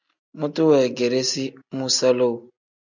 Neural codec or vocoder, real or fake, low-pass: none; real; 7.2 kHz